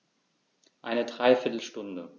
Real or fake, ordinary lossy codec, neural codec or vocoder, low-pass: real; none; none; 7.2 kHz